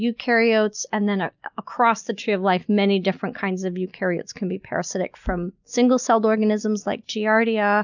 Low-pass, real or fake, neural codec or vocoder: 7.2 kHz; real; none